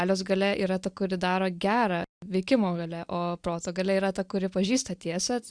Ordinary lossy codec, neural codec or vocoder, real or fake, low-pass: Opus, 64 kbps; none; real; 9.9 kHz